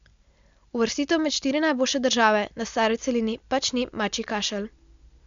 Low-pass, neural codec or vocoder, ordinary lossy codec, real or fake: 7.2 kHz; none; MP3, 64 kbps; real